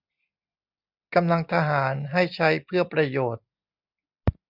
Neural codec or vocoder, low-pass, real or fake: none; 5.4 kHz; real